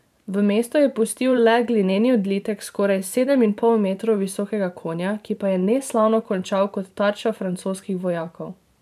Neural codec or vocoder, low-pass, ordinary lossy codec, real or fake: vocoder, 44.1 kHz, 128 mel bands every 512 samples, BigVGAN v2; 14.4 kHz; MP3, 96 kbps; fake